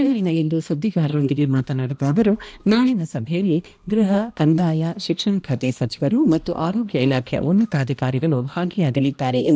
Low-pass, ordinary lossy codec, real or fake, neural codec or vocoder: none; none; fake; codec, 16 kHz, 1 kbps, X-Codec, HuBERT features, trained on balanced general audio